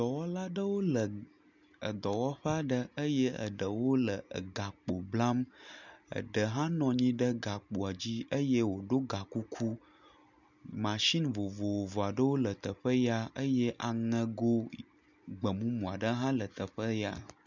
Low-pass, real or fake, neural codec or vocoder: 7.2 kHz; real; none